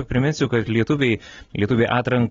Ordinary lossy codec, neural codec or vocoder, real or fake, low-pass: AAC, 24 kbps; none; real; 7.2 kHz